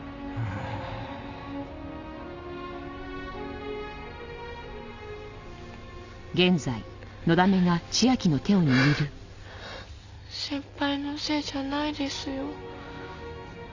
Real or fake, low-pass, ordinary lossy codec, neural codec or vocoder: real; 7.2 kHz; Opus, 64 kbps; none